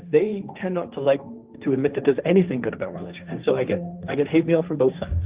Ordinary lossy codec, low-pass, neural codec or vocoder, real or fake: Opus, 32 kbps; 3.6 kHz; codec, 16 kHz, 1.1 kbps, Voila-Tokenizer; fake